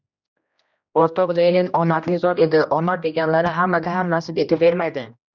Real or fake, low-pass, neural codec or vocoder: fake; 7.2 kHz; codec, 16 kHz, 1 kbps, X-Codec, HuBERT features, trained on general audio